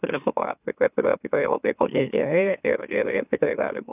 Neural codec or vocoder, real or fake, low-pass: autoencoder, 44.1 kHz, a latent of 192 numbers a frame, MeloTTS; fake; 3.6 kHz